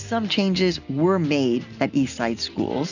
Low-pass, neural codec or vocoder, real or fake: 7.2 kHz; none; real